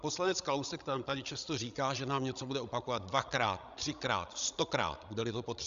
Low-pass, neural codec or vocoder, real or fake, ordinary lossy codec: 7.2 kHz; codec, 16 kHz, 16 kbps, FreqCodec, larger model; fake; Opus, 64 kbps